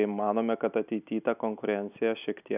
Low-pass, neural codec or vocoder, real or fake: 3.6 kHz; none; real